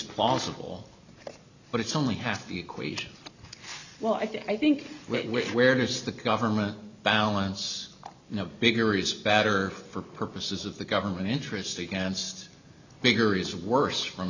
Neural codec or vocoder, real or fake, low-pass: none; real; 7.2 kHz